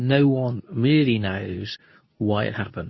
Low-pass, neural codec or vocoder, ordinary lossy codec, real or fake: 7.2 kHz; codec, 24 kHz, 0.9 kbps, WavTokenizer, medium speech release version 2; MP3, 24 kbps; fake